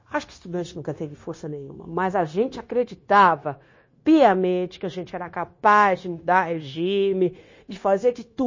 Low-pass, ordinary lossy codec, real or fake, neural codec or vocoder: 7.2 kHz; MP3, 32 kbps; fake; codec, 16 kHz, 0.9 kbps, LongCat-Audio-Codec